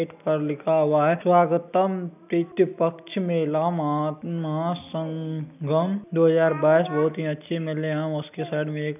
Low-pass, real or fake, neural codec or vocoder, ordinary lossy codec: 3.6 kHz; real; none; none